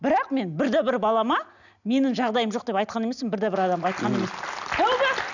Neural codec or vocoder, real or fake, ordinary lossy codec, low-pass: none; real; none; 7.2 kHz